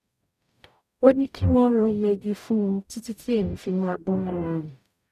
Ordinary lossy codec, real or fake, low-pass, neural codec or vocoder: none; fake; 14.4 kHz; codec, 44.1 kHz, 0.9 kbps, DAC